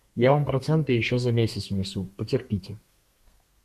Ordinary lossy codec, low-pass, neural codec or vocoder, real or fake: AAC, 96 kbps; 14.4 kHz; codec, 32 kHz, 1.9 kbps, SNAC; fake